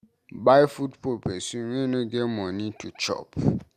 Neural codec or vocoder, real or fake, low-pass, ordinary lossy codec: none; real; 14.4 kHz; Opus, 64 kbps